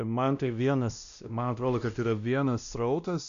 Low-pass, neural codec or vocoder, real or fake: 7.2 kHz; codec, 16 kHz, 1 kbps, X-Codec, WavLM features, trained on Multilingual LibriSpeech; fake